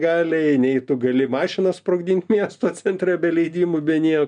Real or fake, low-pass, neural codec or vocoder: real; 9.9 kHz; none